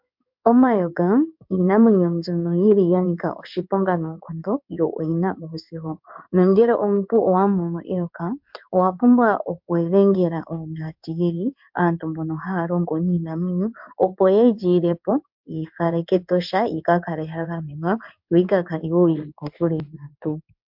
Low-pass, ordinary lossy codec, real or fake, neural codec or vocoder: 5.4 kHz; MP3, 48 kbps; fake; codec, 16 kHz in and 24 kHz out, 1 kbps, XY-Tokenizer